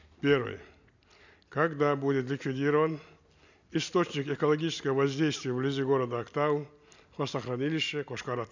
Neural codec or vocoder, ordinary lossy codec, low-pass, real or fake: none; none; 7.2 kHz; real